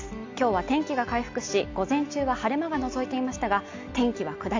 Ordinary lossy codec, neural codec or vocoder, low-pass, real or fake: AAC, 48 kbps; none; 7.2 kHz; real